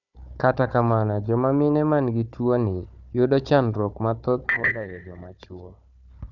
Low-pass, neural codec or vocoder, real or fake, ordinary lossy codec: 7.2 kHz; codec, 16 kHz, 4 kbps, FunCodec, trained on Chinese and English, 50 frames a second; fake; none